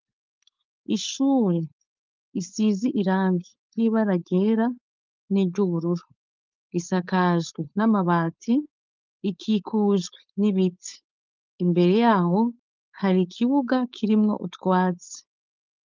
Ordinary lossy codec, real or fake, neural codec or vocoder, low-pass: Opus, 24 kbps; fake; codec, 16 kHz, 4.8 kbps, FACodec; 7.2 kHz